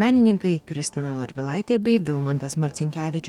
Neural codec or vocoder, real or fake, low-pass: codec, 44.1 kHz, 2.6 kbps, DAC; fake; 19.8 kHz